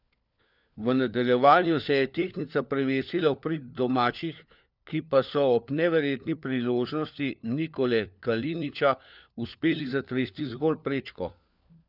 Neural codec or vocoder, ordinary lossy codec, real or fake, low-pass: codec, 16 kHz, 4 kbps, FunCodec, trained on LibriTTS, 50 frames a second; none; fake; 5.4 kHz